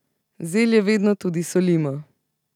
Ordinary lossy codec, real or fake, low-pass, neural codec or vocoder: none; real; 19.8 kHz; none